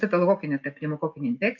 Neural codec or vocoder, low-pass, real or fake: none; 7.2 kHz; real